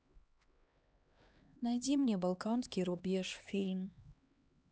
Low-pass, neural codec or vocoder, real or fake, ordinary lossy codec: none; codec, 16 kHz, 2 kbps, X-Codec, HuBERT features, trained on LibriSpeech; fake; none